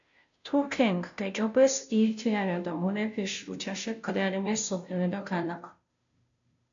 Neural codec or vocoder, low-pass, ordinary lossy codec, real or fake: codec, 16 kHz, 0.5 kbps, FunCodec, trained on Chinese and English, 25 frames a second; 7.2 kHz; MP3, 64 kbps; fake